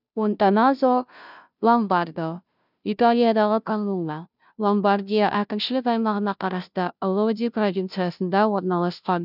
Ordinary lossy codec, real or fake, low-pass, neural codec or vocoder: none; fake; 5.4 kHz; codec, 16 kHz, 0.5 kbps, FunCodec, trained on Chinese and English, 25 frames a second